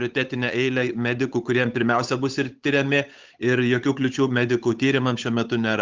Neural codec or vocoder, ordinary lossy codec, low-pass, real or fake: codec, 16 kHz, 8 kbps, FunCodec, trained on Chinese and English, 25 frames a second; Opus, 24 kbps; 7.2 kHz; fake